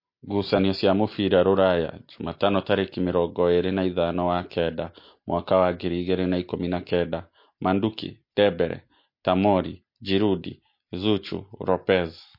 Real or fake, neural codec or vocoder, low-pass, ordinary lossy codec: real; none; 5.4 kHz; MP3, 32 kbps